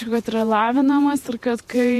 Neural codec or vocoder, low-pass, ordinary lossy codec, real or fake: vocoder, 48 kHz, 128 mel bands, Vocos; 14.4 kHz; MP3, 64 kbps; fake